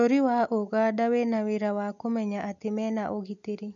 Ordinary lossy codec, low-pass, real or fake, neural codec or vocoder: none; 7.2 kHz; real; none